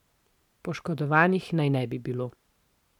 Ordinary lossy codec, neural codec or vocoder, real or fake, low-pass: none; vocoder, 44.1 kHz, 128 mel bands every 512 samples, BigVGAN v2; fake; 19.8 kHz